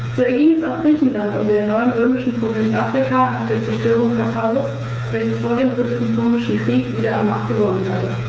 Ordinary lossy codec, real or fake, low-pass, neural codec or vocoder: none; fake; none; codec, 16 kHz, 4 kbps, FreqCodec, smaller model